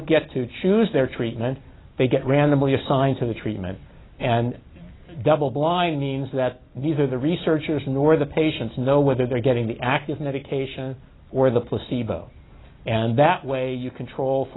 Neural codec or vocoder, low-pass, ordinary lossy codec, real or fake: none; 7.2 kHz; AAC, 16 kbps; real